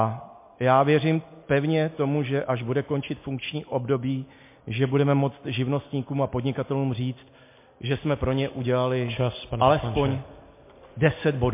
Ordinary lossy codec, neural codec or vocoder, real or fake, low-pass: MP3, 24 kbps; none; real; 3.6 kHz